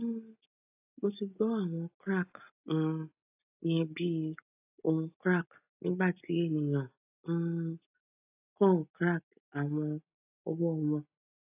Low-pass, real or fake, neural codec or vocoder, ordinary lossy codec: 3.6 kHz; real; none; AAC, 32 kbps